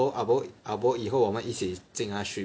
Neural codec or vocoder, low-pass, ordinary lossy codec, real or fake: none; none; none; real